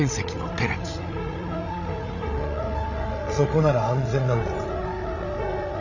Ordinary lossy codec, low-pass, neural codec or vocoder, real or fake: none; 7.2 kHz; codec, 16 kHz, 16 kbps, FreqCodec, larger model; fake